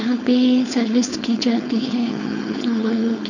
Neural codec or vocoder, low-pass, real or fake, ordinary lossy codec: codec, 16 kHz, 4.8 kbps, FACodec; 7.2 kHz; fake; none